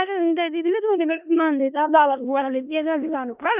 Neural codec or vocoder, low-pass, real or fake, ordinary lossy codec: codec, 16 kHz in and 24 kHz out, 0.4 kbps, LongCat-Audio-Codec, four codebook decoder; 3.6 kHz; fake; none